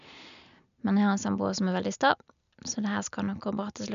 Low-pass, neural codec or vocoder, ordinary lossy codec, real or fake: 7.2 kHz; none; none; real